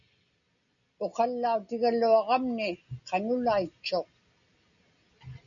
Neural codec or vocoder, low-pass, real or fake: none; 7.2 kHz; real